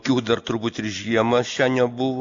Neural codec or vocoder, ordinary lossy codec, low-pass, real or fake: none; AAC, 64 kbps; 7.2 kHz; real